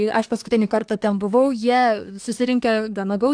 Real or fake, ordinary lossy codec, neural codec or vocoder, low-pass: fake; AAC, 64 kbps; codec, 24 kHz, 1 kbps, SNAC; 9.9 kHz